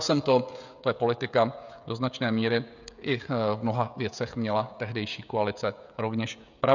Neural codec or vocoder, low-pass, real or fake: codec, 16 kHz, 16 kbps, FreqCodec, smaller model; 7.2 kHz; fake